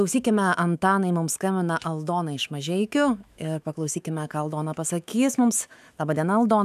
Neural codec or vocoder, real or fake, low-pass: autoencoder, 48 kHz, 128 numbers a frame, DAC-VAE, trained on Japanese speech; fake; 14.4 kHz